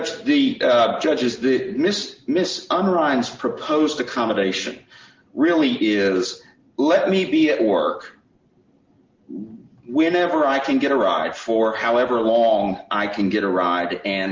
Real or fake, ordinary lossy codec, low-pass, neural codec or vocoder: real; Opus, 32 kbps; 7.2 kHz; none